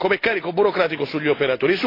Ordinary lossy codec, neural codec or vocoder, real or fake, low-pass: AAC, 24 kbps; none; real; 5.4 kHz